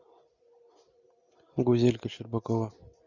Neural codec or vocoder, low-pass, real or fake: none; 7.2 kHz; real